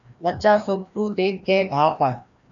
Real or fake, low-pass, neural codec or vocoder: fake; 7.2 kHz; codec, 16 kHz, 1 kbps, FreqCodec, larger model